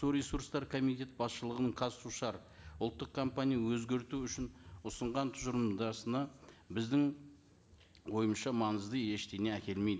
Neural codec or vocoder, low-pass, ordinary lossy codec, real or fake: none; none; none; real